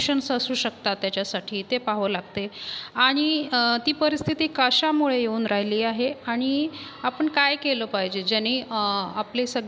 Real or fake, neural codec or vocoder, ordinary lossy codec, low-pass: real; none; none; none